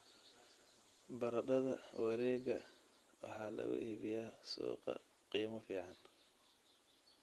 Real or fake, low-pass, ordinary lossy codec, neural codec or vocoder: fake; 19.8 kHz; Opus, 24 kbps; vocoder, 48 kHz, 128 mel bands, Vocos